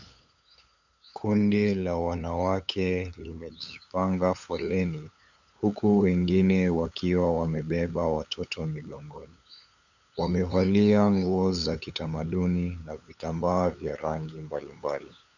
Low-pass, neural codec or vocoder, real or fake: 7.2 kHz; codec, 16 kHz, 8 kbps, FunCodec, trained on LibriTTS, 25 frames a second; fake